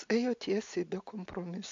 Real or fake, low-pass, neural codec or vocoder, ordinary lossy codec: real; 7.2 kHz; none; MP3, 48 kbps